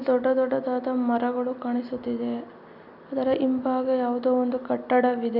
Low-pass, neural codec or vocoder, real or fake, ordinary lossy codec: 5.4 kHz; none; real; none